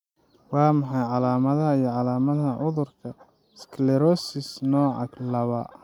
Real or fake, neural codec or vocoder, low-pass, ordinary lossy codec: real; none; 19.8 kHz; none